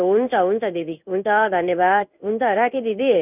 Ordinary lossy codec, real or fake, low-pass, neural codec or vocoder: none; fake; 3.6 kHz; codec, 16 kHz in and 24 kHz out, 1 kbps, XY-Tokenizer